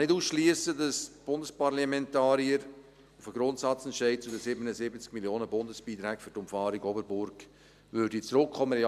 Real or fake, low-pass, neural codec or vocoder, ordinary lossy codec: real; 14.4 kHz; none; none